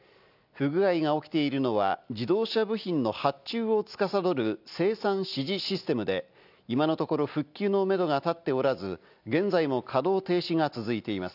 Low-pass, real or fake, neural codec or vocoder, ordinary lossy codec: 5.4 kHz; real; none; none